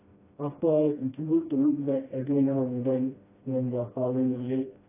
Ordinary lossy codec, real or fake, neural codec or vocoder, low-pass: AAC, 16 kbps; fake; codec, 16 kHz, 1 kbps, FreqCodec, smaller model; 3.6 kHz